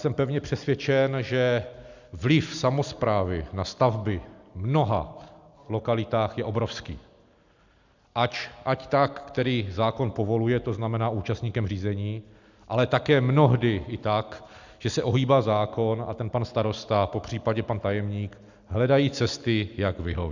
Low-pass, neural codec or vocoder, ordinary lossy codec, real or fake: 7.2 kHz; none; Opus, 64 kbps; real